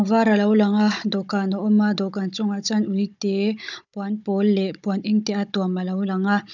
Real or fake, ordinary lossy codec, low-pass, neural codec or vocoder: fake; none; 7.2 kHz; codec, 16 kHz, 16 kbps, FunCodec, trained on Chinese and English, 50 frames a second